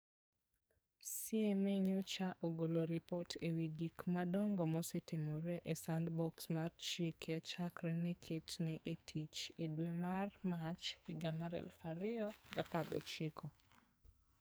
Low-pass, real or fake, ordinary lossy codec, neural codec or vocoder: none; fake; none; codec, 44.1 kHz, 2.6 kbps, SNAC